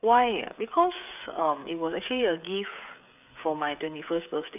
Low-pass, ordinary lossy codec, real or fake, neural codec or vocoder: 3.6 kHz; none; fake; codec, 16 kHz, 8 kbps, FreqCodec, smaller model